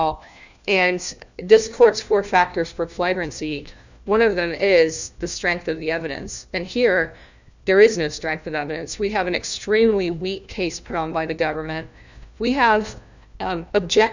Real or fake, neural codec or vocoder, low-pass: fake; codec, 16 kHz, 1 kbps, FunCodec, trained on LibriTTS, 50 frames a second; 7.2 kHz